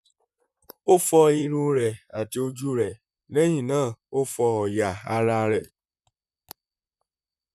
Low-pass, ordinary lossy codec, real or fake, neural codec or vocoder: 14.4 kHz; none; fake; vocoder, 44.1 kHz, 128 mel bands, Pupu-Vocoder